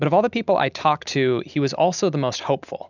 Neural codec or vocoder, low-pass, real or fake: none; 7.2 kHz; real